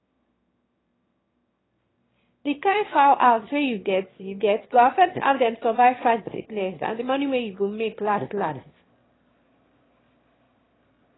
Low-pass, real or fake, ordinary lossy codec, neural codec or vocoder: 7.2 kHz; fake; AAC, 16 kbps; autoencoder, 22.05 kHz, a latent of 192 numbers a frame, VITS, trained on one speaker